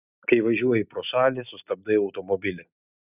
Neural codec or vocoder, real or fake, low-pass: none; real; 3.6 kHz